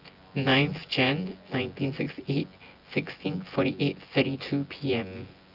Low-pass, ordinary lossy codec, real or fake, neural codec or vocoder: 5.4 kHz; Opus, 24 kbps; fake; vocoder, 24 kHz, 100 mel bands, Vocos